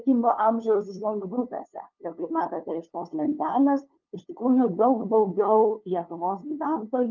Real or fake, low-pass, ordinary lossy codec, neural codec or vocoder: fake; 7.2 kHz; Opus, 24 kbps; codec, 16 kHz, 2 kbps, FunCodec, trained on LibriTTS, 25 frames a second